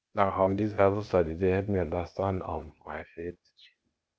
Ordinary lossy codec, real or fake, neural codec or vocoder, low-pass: none; fake; codec, 16 kHz, 0.8 kbps, ZipCodec; none